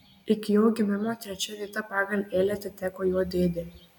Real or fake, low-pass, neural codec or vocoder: real; 19.8 kHz; none